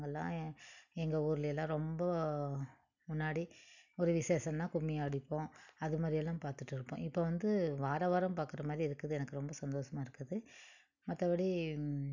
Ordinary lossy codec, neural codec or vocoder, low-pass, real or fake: none; none; 7.2 kHz; real